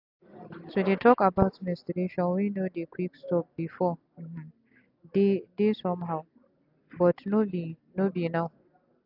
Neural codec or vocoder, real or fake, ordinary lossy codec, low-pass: none; real; AAC, 48 kbps; 5.4 kHz